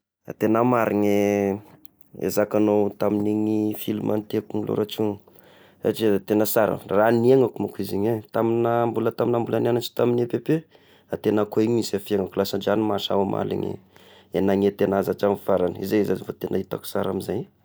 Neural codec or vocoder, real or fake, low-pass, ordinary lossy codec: none; real; none; none